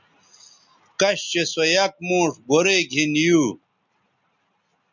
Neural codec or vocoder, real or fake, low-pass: none; real; 7.2 kHz